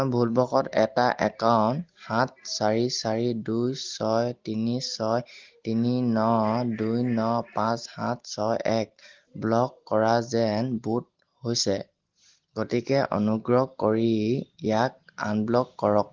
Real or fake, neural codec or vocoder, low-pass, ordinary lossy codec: real; none; 7.2 kHz; Opus, 16 kbps